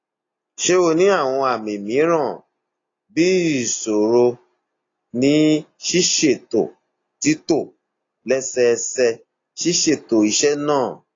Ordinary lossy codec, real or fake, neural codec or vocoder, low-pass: AAC, 32 kbps; real; none; 7.2 kHz